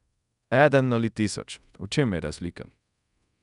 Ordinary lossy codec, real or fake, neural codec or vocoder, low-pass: none; fake; codec, 24 kHz, 0.5 kbps, DualCodec; 10.8 kHz